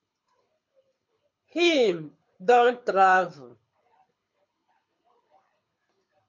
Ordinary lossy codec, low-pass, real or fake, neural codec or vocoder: MP3, 48 kbps; 7.2 kHz; fake; codec, 24 kHz, 6 kbps, HILCodec